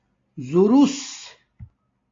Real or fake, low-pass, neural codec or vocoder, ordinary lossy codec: real; 7.2 kHz; none; AAC, 32 kbps